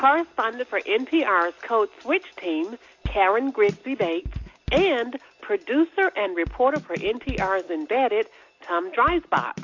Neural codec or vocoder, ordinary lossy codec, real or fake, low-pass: none; AAC, 48 kbps; real; 7.2 kHz